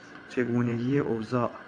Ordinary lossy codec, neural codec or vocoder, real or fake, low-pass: AAC, 48 kbps; vocoder, 22.05 kHz, 80 mel bands, WaveNeXt; fake; 9.9 kHz